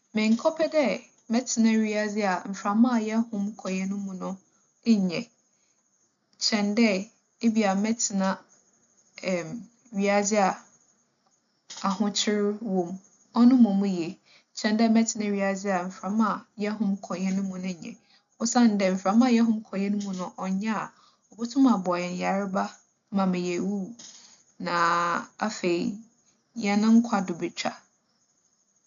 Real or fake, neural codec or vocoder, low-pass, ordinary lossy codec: real; none; 7.2 kHz; none